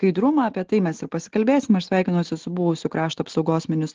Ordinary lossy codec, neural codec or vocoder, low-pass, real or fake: Opus, 16 kbps; none; 7.2 kHz; real